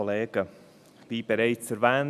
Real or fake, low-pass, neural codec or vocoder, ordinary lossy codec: real; 14.4 kHz; none; none